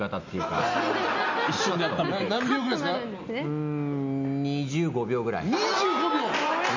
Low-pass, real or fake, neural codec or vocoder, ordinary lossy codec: 7.2 kHz; real; none; MP3, 64 kbps